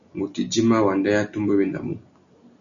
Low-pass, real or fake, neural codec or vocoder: 7.2 kHz; real; none